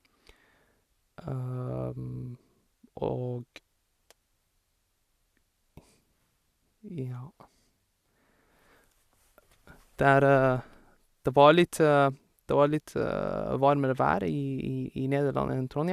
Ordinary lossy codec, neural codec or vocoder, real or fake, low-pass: Opus, 64 kbps; none; real; 14.4 kHz